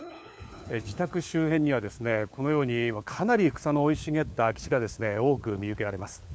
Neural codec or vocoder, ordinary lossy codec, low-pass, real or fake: codec, 16 kHz, 4 kbps, FunCodec, trained on LibriTTS, 50 frames a second; none; none; fake